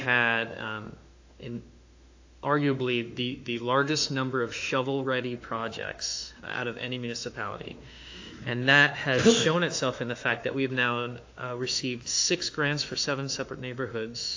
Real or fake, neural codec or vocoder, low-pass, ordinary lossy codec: fake; autoencoder, 48 kHz, 32 numbers a frame, DAC-VAE, trained on Japanese speech; 7.2 kHz; AAC, 48 kbps